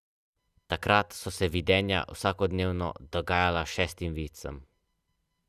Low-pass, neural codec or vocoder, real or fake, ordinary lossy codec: 14.4 kHz; none; real; Opus, 64 kbps